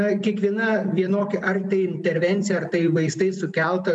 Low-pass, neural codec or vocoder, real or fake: 10.8 kHz; none; real